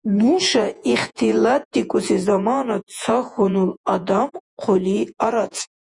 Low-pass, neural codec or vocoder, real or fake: 10.8 kHz; vocoder, 48 kHz, 128 mel bands, Vocos; fake